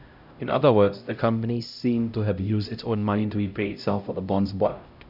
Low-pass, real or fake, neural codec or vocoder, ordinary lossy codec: 5.4 kHz; fake; codec, 16 kHz, 0.5 kbps, X-Codec, HuBERT features, trained on LibriSpeech; none